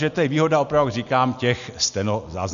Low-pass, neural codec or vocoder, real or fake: 7.2 kHz; none; real